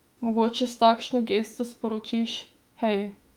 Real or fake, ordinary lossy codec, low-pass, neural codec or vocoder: fake; Opus, 32 kbps; 19.8 kHz; autoencoder, 48 kHz, 32 numbers a frame, DAC-VAE, trained on Japanese speech